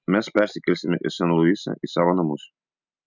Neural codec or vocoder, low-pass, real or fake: none; 7.2 kHz; real